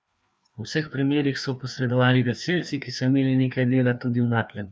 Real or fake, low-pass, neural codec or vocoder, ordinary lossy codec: fake; none; codec, 16 kHz, 2 kbps, FreqCodec, larger model; none